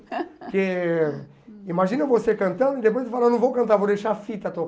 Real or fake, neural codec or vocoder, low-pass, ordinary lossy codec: real; none; none; none